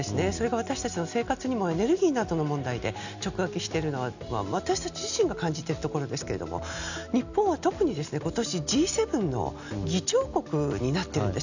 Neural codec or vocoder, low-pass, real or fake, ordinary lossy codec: none; 7.2 kHz; real; none